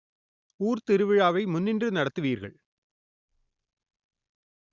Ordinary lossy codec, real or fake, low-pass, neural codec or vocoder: Opus, 64 kbps; real; 7.2 kHz; none